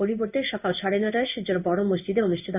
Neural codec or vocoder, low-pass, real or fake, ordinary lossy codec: codec, 16 kHz in and 24 kHz out, 1 kbps, XY-Tokenizer; 3.6 kHz; fake; none